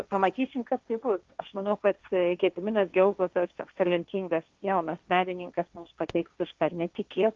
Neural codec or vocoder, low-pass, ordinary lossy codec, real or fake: codec, 16 kHz, 1.1 kbps, Voila-Tokenizer; 7.2 kHz; Opus, 24 kbps; fake